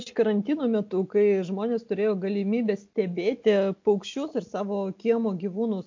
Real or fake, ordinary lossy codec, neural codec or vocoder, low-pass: real; MP3, 48 kbps; none; 7.2 kHz